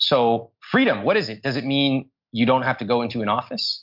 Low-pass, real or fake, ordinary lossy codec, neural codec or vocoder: 5.4 kHz; real; MP3, 48 kbps; none